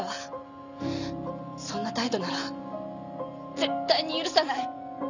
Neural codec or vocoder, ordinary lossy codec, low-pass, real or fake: none; none; 7.2 kHz; real